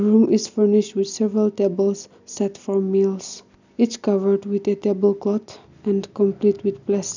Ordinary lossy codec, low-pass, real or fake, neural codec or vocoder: none; 7.2 kHz; real; none